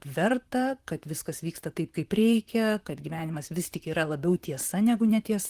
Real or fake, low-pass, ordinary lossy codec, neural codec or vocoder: fake; 14.4 kHz; Opus, 24 kbps; vocoder, 44.1 kHz, 128 mel bands, Pupu-Vocoder